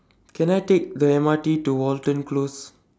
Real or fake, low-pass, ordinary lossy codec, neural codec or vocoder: real; none; none; none